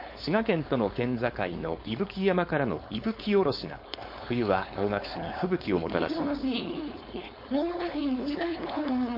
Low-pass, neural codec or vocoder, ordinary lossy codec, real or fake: 5.4 kHz; codec, 16 kHz, 4.8 kbps, FACodec; MP3, 32 kbps; fake